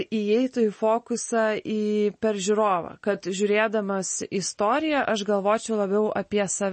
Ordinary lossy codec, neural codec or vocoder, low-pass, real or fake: MP3, 32 kbps; none; 10.8 kHz; real